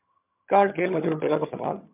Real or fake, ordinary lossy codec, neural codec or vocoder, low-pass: fake; MP3, 32 kbps; vocoder, 22.05 kHz, 80 mel bands, HiFi-GAN; 3.6 kHz